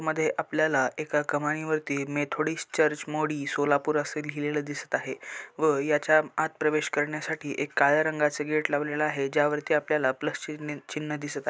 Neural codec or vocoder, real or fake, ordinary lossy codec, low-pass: none; real; none; none